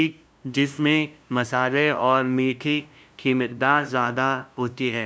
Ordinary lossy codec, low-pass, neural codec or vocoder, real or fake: none; none; codec, 16 kHz, 0.5 kbps, FunCodec, trained on LibriTTS, 25 frames a second; fake